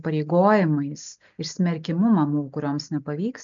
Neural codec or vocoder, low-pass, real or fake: none; 7.2 kHz; real